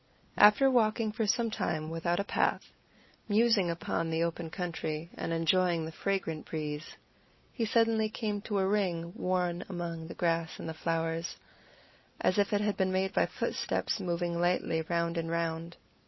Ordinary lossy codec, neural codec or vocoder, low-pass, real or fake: MP3, 24 kbps; none; 7.2 kHz; real